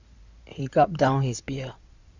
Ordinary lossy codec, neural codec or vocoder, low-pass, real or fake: Opus, 64 kbps; codec, 16 kHz in and 24 kHz out, 2.2 kbps, FireRedTTS-2 codec; 7.2 kHz; fake